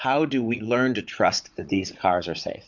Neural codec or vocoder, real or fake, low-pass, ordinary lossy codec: vocoder, 44.1 kHz, 80 mel bands, Vocos; fake; 7.2 kHz; MP3, 64 kbps